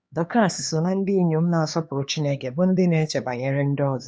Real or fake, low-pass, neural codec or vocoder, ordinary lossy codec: fake; none; codec, 16 kHz, 2 kbps, X-Codec, HuBERT features, trained on LibriSpeech; none